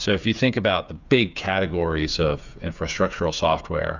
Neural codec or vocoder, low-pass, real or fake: codec, 16 kHz, 8 kbps, FreqCodec, smaller model; 7.2 kHz; fake